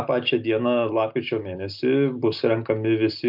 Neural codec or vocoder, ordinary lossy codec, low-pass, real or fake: none; MP3, 48 kbps; 5.4 kHz; real